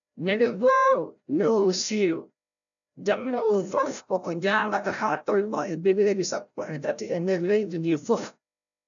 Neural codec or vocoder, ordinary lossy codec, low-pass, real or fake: codec, 16 kHz, 0.5 kbps, FreqCodec, larger model; none; 7.2 kHz; fake